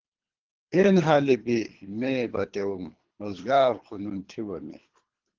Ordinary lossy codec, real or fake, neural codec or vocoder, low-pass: Opus, 16 kbps; fake; codec, 24 kHz, 3 kbps, HILCodec; 7.2 kHz